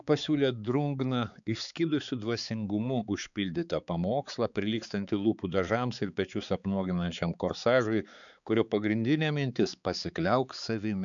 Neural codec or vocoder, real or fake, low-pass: codec, 16 kHz, 4 kbps, X-Codec, HuBERT features, trained on balanced general audio; fake; 7.2 kHz